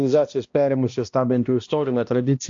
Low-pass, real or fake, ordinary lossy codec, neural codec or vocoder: 7.2 kHz; fake; AAC, 48 kbps; codec, 16 kHz, 1 kbps, X-Codec, HuBERT features, trained on balanced general audio